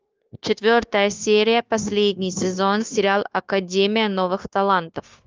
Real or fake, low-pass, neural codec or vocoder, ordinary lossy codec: fake; 7.2 kHz; codec, 16 kHz, 0.9 kbps, LongCat-Audio-Codec; Opus, 32 kbps